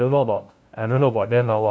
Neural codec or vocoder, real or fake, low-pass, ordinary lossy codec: codec, 16 kHz, 0.5 kbps, FunCodec, trained on LibriTTS, 25 frames a second; fake; none; none